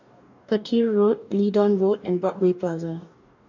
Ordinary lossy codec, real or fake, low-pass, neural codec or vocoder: none; fake; 7.2 kHz; codec, 44.1 kHz, 2.6 kbps, DAC